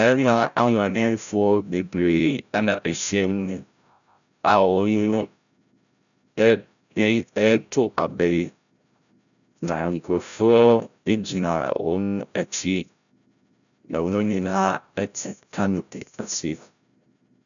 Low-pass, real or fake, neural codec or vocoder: 7.2 kHz; fake; codec, 16 kHz, 0.5 kbps, FreqCodec, larger model